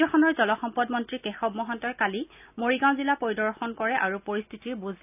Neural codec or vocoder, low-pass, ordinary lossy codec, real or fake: none; 3.6 kHz; none; real